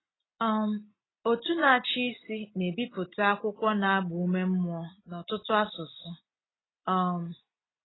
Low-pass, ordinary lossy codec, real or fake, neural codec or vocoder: 7.2 kHz; AAC, 16 kbps; real; none